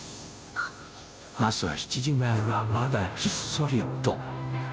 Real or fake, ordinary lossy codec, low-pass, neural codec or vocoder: fake; none; none; codec, 16 kHz, 0.5 kbps, FunCodec, trained on Chinese and English, 25 frames a second